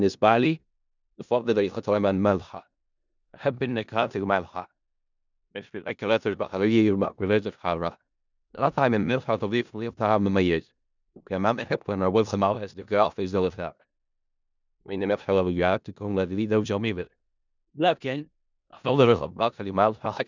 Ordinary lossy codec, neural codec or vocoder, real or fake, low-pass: none; codec, 16 kHz in and 24 kHz out, 0.4 kbps, LongCat-Audio-Codec, four codebook decoder; fake; 7.2 kHz